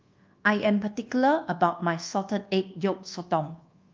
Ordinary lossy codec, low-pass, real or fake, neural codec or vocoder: Opus, 24 kbps; 7.2 kHz; fake; codec, 24 kHz, 1.2 kbps, DualCodec